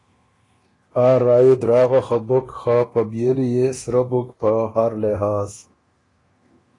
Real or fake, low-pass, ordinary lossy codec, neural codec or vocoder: fake; 10.8 kHz; AAC, 32 kbps; codec, 24 kHz, 0.9 kbps, DualCodec